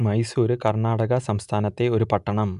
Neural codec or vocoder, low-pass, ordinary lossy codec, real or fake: none; 10.8 kHz; none; real